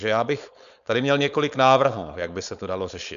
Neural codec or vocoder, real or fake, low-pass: codec, 16 kHz, 4.8 kbps, FACodec; fake; 7.2 kHz